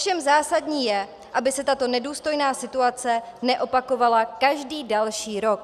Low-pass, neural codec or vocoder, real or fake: 14.4 kHz; none; real